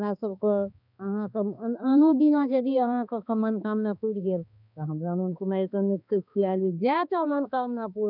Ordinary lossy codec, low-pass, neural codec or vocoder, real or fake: none; 5.4 kHz; codec, 16 kHz, 2 kbps, X-Codec, HuBERT features, trained on balanced general audio; fake